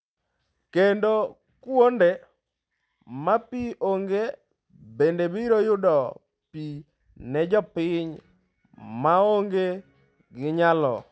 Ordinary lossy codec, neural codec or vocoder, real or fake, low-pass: none; none; real; none